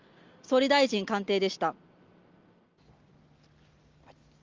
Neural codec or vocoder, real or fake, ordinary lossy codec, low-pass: none; real; Opus, 32 kbps; 7.2 kHz